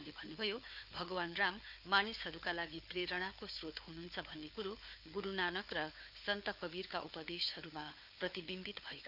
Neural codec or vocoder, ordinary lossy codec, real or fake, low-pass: codec, 16 kHz, 4 kbps, FunCodec, trained on Chinese and English, 50 frames a second; none; fake; 5.4 kHz